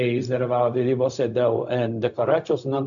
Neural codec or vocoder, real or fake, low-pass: codec, 16 kHz, 0.4 kbps, LongCat-Audio-Codec; fake; 7.2 kHz